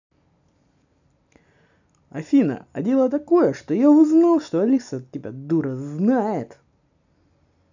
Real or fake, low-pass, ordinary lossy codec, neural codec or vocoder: real; 7.2 kHz; none; none